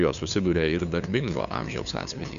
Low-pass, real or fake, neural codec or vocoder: 7.2 kHz; fake; codec, 16 kHz, 2 kbps, FunCodec, trained on LibriTTS, 25 frames a second